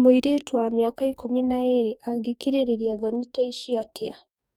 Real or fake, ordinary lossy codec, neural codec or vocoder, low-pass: fake; none; codec, 44.1 kHz, 2.6 kbps, DAC; 19.8 kHz